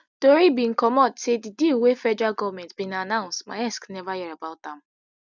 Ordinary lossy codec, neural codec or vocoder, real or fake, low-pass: none; none; real; 7.2 kHz